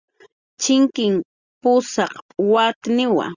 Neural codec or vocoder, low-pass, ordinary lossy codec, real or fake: none; 7.2 kHz; Opus, 64 kbps; real